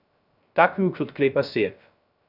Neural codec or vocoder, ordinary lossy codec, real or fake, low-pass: codec, 16 kHz, 0.3 kbps, FocalCodec; none; fake; 5.4 kHz